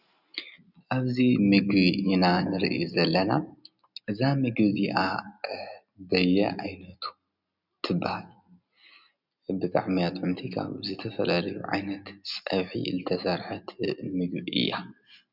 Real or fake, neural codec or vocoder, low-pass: real; none; 5.4 kHz